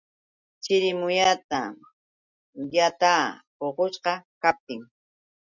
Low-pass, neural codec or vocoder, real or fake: 7.2 kHz; none; real